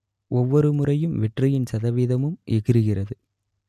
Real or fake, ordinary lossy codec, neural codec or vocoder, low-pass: real; MP3, 96 kbps; none; 14.4 kHz